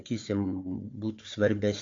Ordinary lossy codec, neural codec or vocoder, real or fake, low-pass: AAC, 48 kbps; codec, 16 kHz, 4 kbps, FunCodec, trained on Chinese and English, 50 frames a second; fake; 7.2 kHz